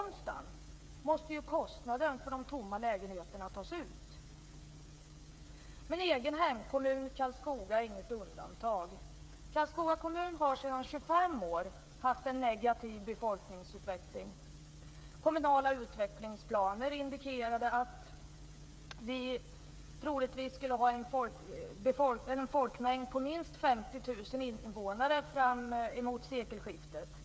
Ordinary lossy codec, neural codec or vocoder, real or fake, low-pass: none; codec, 16 kHz, 8 kbps, FreqCodec, smaller model; fake; none